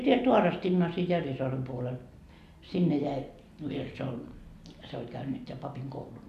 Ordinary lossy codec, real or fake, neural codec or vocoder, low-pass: MP3, 96 kbps; real; none; 14.4 kHz